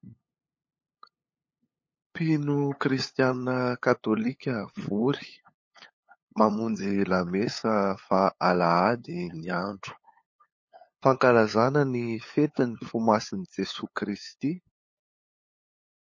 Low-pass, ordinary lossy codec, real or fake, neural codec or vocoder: 7.2 kHz; MP3, 32 kbps; fake; codec, 16 kHz, 8 kbps, FunCodec, trained on LibriTTS, 25 frames a second